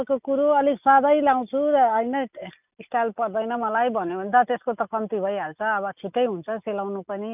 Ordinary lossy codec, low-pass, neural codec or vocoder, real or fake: none; 3.6 kHz; none; real